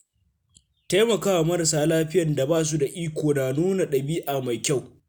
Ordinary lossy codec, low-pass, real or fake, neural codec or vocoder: none; none; real; none